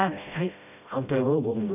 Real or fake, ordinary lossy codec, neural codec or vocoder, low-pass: fake; none; codec, 16 kHz, 0.5 kbps, FreqCodec, smaller model; 3.6 kHz